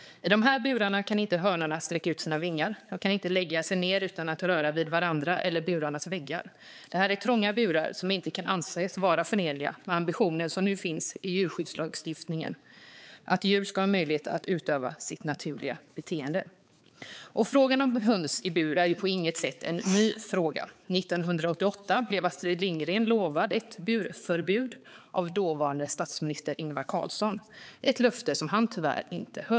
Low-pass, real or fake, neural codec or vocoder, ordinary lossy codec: none; fake; codec, 16 kHz, 4 kbps, X-Codec, HuBERT features, trained on balanced general audio; none